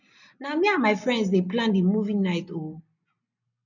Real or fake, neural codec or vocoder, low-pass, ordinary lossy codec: real; none; 7.2 kHz; none